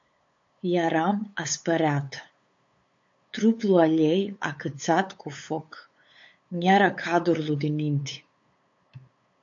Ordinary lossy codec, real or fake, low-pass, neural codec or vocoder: AAC, 48 kbps; fake; 7.2 kHz; codec, 16 kHz, 8 kbps, FunCodec, trained on LibriTTS, 25 frames a second